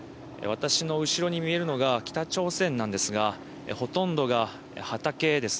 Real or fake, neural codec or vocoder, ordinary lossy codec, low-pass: real; none; none; none